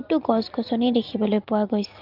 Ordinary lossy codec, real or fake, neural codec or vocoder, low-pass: Opus, 32 kbps; real; none; 5.4 kHz